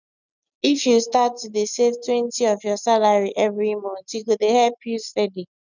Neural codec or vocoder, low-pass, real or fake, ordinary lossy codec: none; 7.2 kHz; real; none